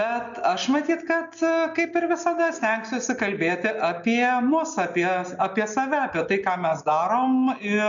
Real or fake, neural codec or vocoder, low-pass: real; none; 7.2 kHz